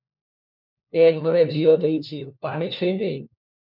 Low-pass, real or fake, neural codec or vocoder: 5.4 kHz; fake; codec, 16 kHz, 1 kbps, FunCodec, trained on LibriTTS, 50 frames a second